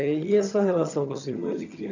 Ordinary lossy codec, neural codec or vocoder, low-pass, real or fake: none; vocoder, 22.05 kHz, 80 mel bands, HiFi-GAN; 7.2 kHz; fake